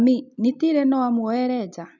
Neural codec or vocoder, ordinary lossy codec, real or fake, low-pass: none; none; real; 7.2 kHz